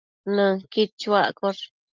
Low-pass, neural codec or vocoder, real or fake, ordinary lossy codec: 7.2 kHz; none; real; Opus, 32 kbps